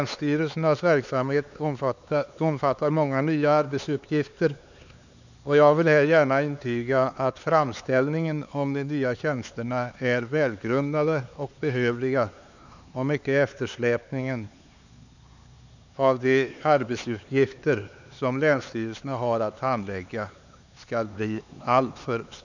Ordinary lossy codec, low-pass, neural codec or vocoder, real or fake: none; 7.2 kHz; codec, 16 kHz, 4 kbps, X-Codec, HuBERT features, trained on LibriSpeech; fake